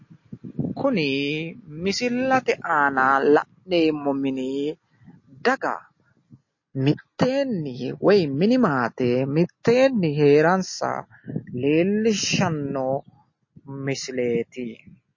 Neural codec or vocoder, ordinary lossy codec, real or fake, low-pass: none; MP3, 32 kbps; real; 7.2 kHz